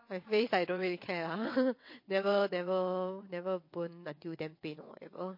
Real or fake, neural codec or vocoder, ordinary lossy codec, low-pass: fake; vocoder, 22.05 kHz, 80 mel bands, WaveNeXt; MP3, 32 kbps; 5.4 kHz